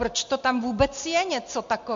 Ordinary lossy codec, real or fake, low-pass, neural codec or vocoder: MP3, 48 kbps; real; 7.2 kHz; none